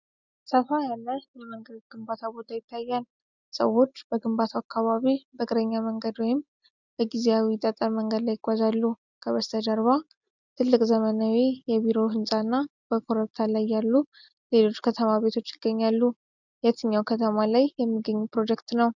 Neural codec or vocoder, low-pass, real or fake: none; 7.2 kHz; real